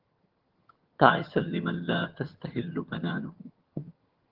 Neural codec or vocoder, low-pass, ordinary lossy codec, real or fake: vocoder, 22.05 kHz, 80 mel bands, HiFi-GAN; 5.4 kHz; Opus, 16 kbps; fake